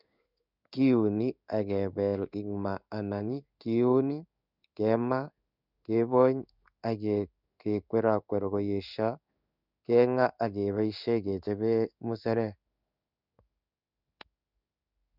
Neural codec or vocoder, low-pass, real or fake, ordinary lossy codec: codec, 16 kHz in and 24 kHz out, 1 kbps, XY-Tokenizer; 5.4 kHz; fake; none